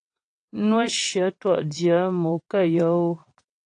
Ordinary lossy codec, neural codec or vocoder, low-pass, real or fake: AAC, 48 kbps; vocoder, 22.05 kHz, 80 mel bands, WaveNeXt; 9.9 kHz; fake